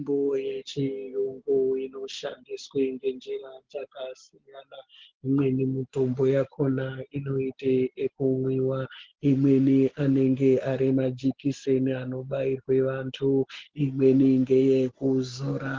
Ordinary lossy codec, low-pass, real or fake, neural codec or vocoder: Opus, 16 kbps; 7.2 kHz; real; none